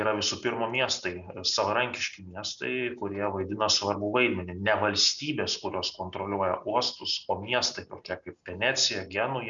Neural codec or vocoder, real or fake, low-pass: none; real; 7.2 kHz